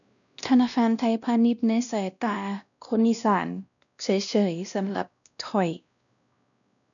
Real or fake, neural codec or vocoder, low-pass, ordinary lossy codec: fake; codec, 16 kHz, 1 kbps, X-Codec, WavLM features, trained on Multilingual LibriSpeech; 7.2 kHz; none